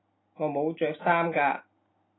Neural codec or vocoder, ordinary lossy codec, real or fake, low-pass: none; AAC, 16 kbps; real; 7.2 kHz